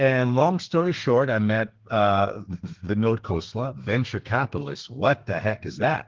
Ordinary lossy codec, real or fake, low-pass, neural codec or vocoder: Opus, 16 kbps; fake; 7.2 kHz; codec, 32 kHz, 1.9 kbps, SNAC